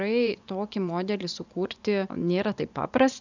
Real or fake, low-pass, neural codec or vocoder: fake; 7.2 kHz; vocoder, 24 kHz, 100 mel bands, Vocos